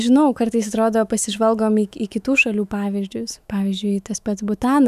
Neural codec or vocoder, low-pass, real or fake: autoencoder, 48 kHz, 128 numbers a frame, DAC-VAE, trained on Japanese speech; 14.4 kHz; fake